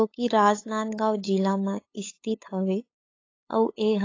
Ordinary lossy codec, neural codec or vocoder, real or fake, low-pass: AAC, 32 kbps; codec, 16 kHz, 8 kbps, FunCodec, trained on LibriTTS, 25 frames a second; fake; 7.2 kHz